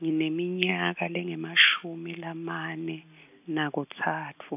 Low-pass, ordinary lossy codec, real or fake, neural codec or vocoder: 3.6 kHz; AAC, 32 kbps; real; none